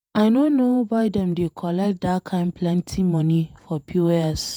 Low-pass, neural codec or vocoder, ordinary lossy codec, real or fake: none; vocoder, 48 kHz, 128 mel bands, Vocos; none; fake